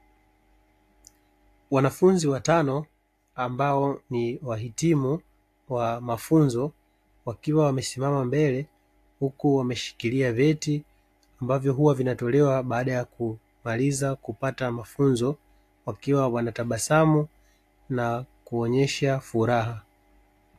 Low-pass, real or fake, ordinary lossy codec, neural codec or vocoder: 14.4 kHz; real; AAC, 64 kbps; none